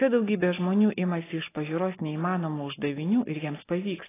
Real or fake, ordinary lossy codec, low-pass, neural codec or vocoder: real; AAC, 16 kbps; 3.6 kHz; none